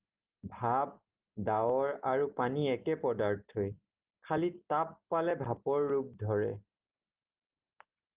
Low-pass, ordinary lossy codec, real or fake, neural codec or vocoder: 3.6 kHz; Opus, 32 kbps; real; none